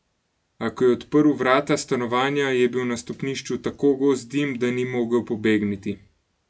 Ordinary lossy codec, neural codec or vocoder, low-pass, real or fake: none; none; none; real